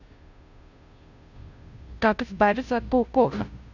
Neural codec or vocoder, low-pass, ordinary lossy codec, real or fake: codec, 16 kHz, 0.5 kbps, FunCodec, trained on Chinese and English, 25 frames a second; 7.2 kHz; none; fake